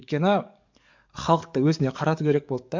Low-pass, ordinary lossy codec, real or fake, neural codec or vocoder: 7.2 kHz; MP3, 64 kbps; fake; codec, 44.1 kHz, 7.8 kbps, DAC